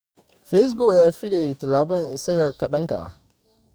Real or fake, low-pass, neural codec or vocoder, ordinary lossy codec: fake; none; codec, 44.1 kHz, 2.6 kbps, DAC; none